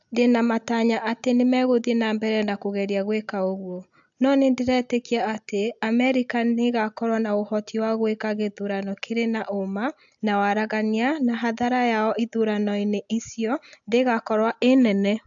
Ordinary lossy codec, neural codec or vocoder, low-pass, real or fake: AAC, 64 kbps; none; 7.2 kHz; real